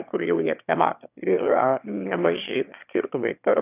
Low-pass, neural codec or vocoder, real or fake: 3.6 kHz; autoencoder, 22.05 kHz, a latent of 192 numbers a frame, VITS, trained on one speaker; fake